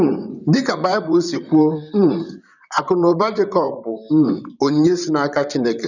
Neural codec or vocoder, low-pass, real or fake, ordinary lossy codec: vocoder, 44.1 kHz, 128 mel bands, Pupu-Vocoder; 7.2 kHz; fake; none